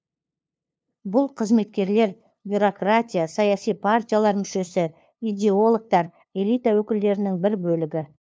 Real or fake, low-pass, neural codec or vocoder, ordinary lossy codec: fake; none; codec, 16 kHz, 2 kbps, FunCodec, trained on LibriTTS, 25 frames a second; none